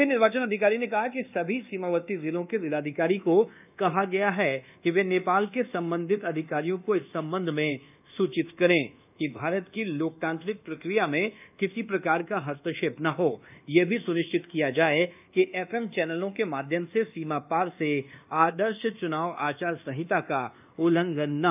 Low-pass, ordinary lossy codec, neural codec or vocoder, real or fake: 3.6 kHz; none; codec, 24 kHz, 1.2 kbps, DualCodec; fake